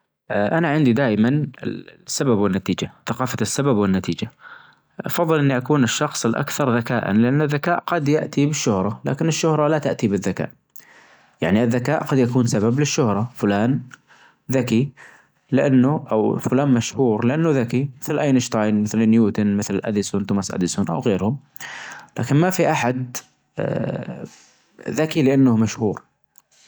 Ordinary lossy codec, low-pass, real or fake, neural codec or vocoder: none; none; real; none